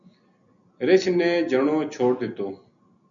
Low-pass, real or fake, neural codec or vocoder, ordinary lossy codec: 7.2 kHz; real; none; MP3, 96 kbps